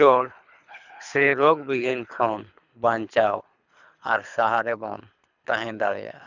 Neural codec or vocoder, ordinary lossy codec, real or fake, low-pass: codec, 24 kHz, 3 kbps, HILCodec; none; fake; 7.2 kHz